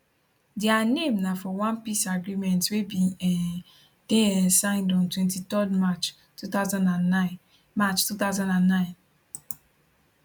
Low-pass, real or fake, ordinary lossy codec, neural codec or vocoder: 19.8 kHz; real; none; none